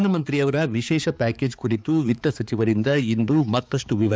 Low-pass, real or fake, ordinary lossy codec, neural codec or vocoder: none; fake; none; codec, 16 kHz, 4 kbps, X-Codec, HuBERT features, trained on balanced general audio